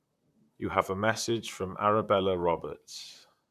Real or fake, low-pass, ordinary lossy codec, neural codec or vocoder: fake; 14.4 kHz; none; vocoder, 44.1 kHz, 128 mel bands, Pupu-Vocoder